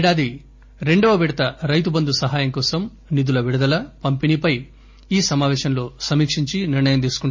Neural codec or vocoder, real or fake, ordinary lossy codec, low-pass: none; real; MP3, 32 kbps; 7.2 kHz